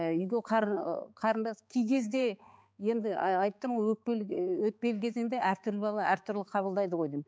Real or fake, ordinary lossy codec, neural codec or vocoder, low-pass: fake; none; codec, 16 kHz, 4 kbps, X-Codec, HuBERT features, trained on balanced general audio; none